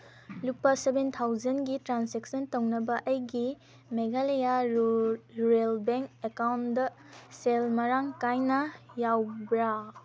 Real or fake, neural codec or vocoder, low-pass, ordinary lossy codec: real; none; none; none